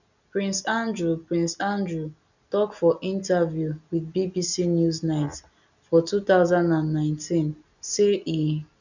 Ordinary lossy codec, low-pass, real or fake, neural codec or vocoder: none; 7.2 kHz; real; none